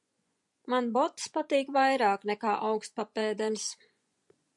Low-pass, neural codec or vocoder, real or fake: 10.8 kHz; vocoder, 24 kHz, 100 mel bands, Vocos; fake